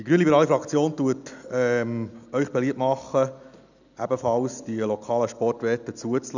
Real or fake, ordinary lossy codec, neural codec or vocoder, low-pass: real; none; none; 7.2 kHz